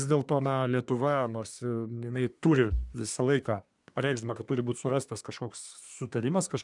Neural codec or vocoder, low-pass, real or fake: codec, 24 kHz, 1 kbps, SNAC; 10.8 kHz; fake